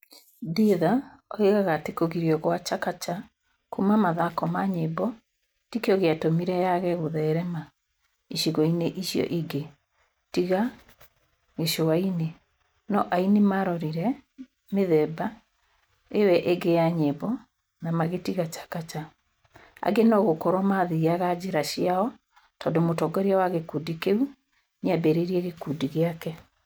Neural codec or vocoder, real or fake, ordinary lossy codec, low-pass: none; real; none; none